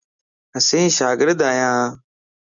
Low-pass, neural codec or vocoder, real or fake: 7.2 kHz; none; real